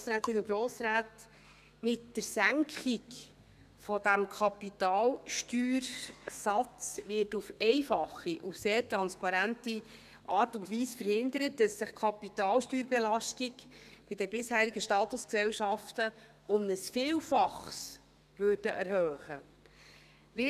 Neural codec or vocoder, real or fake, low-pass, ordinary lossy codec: codec, 32 kHz, 1.9 kbps, SNAC; fake; 14.4 kHz; none